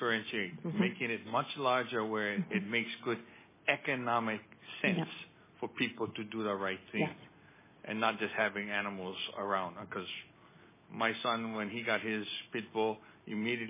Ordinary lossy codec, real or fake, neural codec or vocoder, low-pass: MP3, 16 kbps; real; none; 3.6 kHz